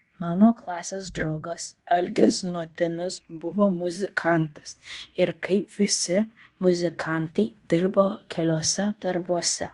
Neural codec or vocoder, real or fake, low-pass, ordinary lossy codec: codec, 16 kHz in and 24 kHz out, 0.9 kbps, LongCat-Audio-Codec, fine tuned four codebook decoder; fake; 10.8 kHz; Opus, 64 kbps